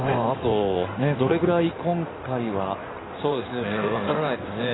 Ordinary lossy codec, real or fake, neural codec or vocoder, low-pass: AAC, 16 kbps; fake; codec, 16 kHz in and 24 kHz out, 2.2 kbps, FireRedTTS-2 codec; 7.2 kHz